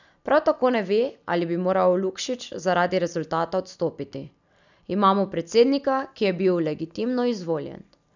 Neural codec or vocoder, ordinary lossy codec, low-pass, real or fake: none; none; 7.2 kHz; real